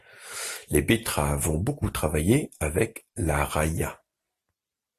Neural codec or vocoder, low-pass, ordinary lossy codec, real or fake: none; 14.4 kHz; AAC, 48 kbps; real